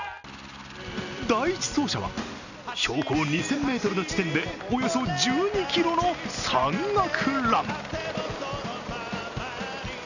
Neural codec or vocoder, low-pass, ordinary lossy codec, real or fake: none; 7.2 kHz; none; real